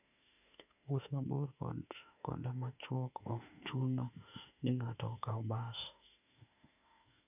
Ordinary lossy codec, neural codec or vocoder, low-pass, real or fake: none; autoencoder, 48 kHz, 32 numbers a frame, DAC-VAE, trained on Japanese speech; 3.6 kHz; fake